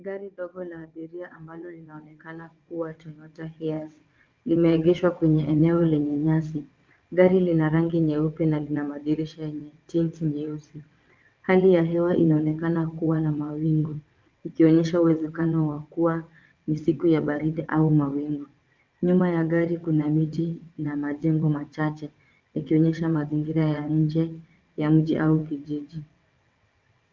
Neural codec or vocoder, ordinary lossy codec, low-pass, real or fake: vocoder, 22.05 kHz, 80 mel bands, WaveNeXt; Opus, 24 kbps; 7.2 kHz; fake